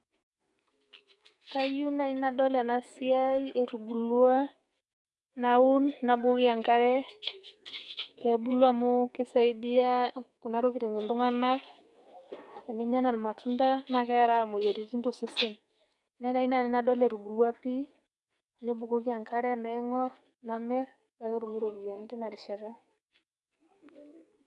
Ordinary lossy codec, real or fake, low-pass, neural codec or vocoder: none; fake; 10.8 kHz; codec, 44.1 kHz, 2.6 kbps, SNAC